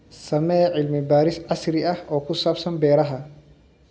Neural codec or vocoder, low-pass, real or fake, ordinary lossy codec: none; none; real; none